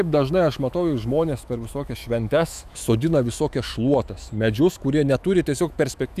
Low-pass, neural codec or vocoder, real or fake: 14.4 kHz; autoencoder, 48 kHz, 128 numbers a frame, DAC-VAE, trained on Japanese speech; fake